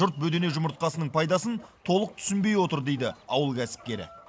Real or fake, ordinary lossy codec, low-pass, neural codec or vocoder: real; none; none; none